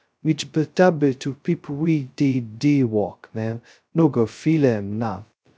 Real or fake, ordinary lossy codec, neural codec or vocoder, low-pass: fake; none; codec, 16 kHz, 0.2 kbps, FocalCodec; none